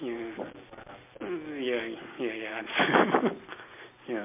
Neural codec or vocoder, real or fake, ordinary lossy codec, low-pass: none; real; MP3, 32 kbps; 3.6 kHz